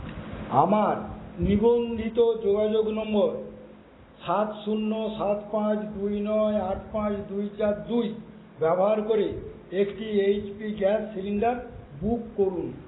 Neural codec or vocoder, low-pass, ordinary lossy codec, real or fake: none; 7.2 kHz; AAC, 16 kbps; real